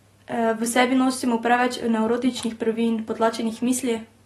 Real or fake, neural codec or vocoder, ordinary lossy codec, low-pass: real; none; AAC, 32 kbps; 19.8 kHz